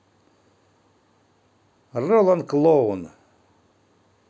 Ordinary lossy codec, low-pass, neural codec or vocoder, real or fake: none; none; none; real